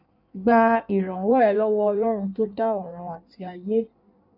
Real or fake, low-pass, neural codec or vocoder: fake; 5.4 kHz; codec, 16 kHz in and 24 kHz out, 1.1 kbps, FireRedTTS-2 codec